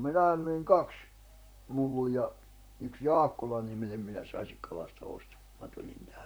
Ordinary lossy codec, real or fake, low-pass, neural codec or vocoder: none; fake; none; vocoder, 44.1 kHz, 128 mel bands, Pupu-Vocoder